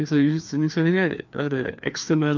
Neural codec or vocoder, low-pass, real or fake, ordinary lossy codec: codec, 16 kHz, 2 kbps, FreqCodec, larger model; 7.2 kHz; fake; none